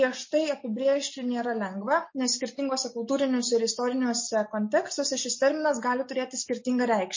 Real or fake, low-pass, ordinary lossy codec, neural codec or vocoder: real; 7.2 kHz; MP3, 32 kbps; none